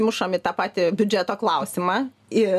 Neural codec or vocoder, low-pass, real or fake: none; 14.4 kHz; real